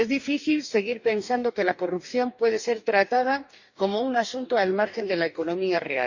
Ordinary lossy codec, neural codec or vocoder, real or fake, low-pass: none; codec, 44.1 kHz, 2.6 kbps, DAC; fake; 7.2 kHz